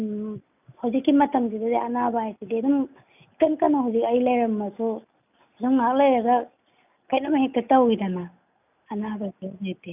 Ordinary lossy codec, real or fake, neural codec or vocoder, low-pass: none; real; none; 3.6 kHz